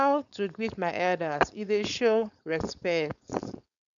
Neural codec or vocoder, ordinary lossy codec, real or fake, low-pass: codec, 16 kHz, 4.8 kbps, FACodec; none; fake; 7.2 kHz